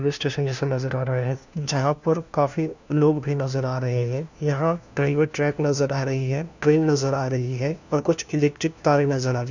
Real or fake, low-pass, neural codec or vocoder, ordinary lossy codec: fake; 7.2 kHz; codec, 16 kHz, 1 kbps, FunCodec, trained on LibriTTS, 50 frames a second; none